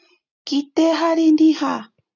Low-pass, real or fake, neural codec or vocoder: 7.2 kHz; real; none